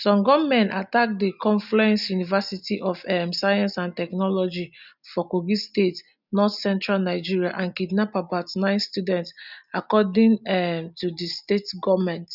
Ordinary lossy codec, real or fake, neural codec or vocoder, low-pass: none; real; none; 5.4 kHz